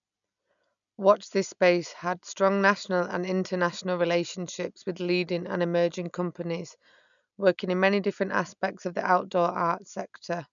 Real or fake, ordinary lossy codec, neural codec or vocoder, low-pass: real; none; none; 7.2 kHz